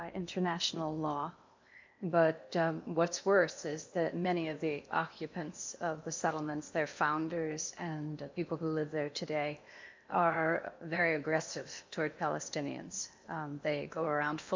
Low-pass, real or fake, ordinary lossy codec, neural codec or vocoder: 7.2 kHz; fake; MP3, 64 kbps; codec, 16 kHz in and 24 kHz out, 0.8 kbps, FocalCodec, streaming, 65536 codes